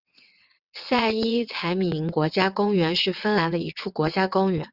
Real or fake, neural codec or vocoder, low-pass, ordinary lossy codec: fake; vocoder, 22.05 kHz, 80 mel bands, WaveNeXt; 5.4 kHz; Opus, 64 kbps